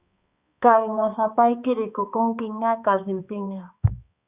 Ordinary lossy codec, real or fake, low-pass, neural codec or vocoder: Opus, 64 kbps; fake; 3.6 kHz; codec, 16 kHz, 2 kbps, X-Codec, HuBERT features, trained on balanced general audio